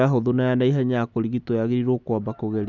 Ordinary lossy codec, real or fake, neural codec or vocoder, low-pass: none; real; none; 7.2 kHz